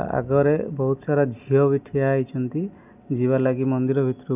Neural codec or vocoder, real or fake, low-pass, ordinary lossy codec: none; real; 3.6 kHz; none